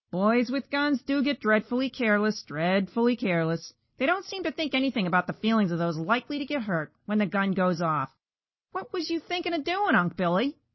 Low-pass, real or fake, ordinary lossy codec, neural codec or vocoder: 7.2 kHz; real; MP3, 24 kbps; none